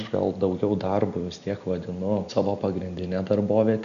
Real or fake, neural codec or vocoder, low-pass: real; none; 7.2 kHz